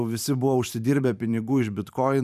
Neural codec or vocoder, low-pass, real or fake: vocoder, 44.1 kHz, 128 mel bands every 512 samples, BigVGAN v2; 14.4 kHz; fake